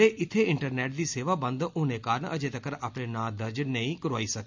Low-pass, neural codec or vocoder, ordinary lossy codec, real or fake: 7.2 kHz; none; MP3, 64 kbps; real